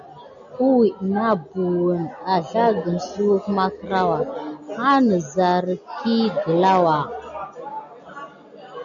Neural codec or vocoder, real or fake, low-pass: none; real; 7.2 kHz